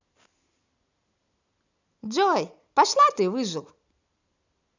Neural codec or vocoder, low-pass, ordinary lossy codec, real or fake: none; 7.2 kHz; none; real